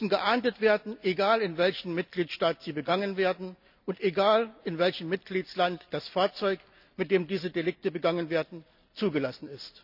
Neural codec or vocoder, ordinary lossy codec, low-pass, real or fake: none; none; 5.4 kHz; real